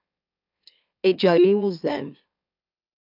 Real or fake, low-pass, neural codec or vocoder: fake; 5.4 kHz; autoencoder, 44.1 kHz, a latent of 192 numbers a frame, MeloTTS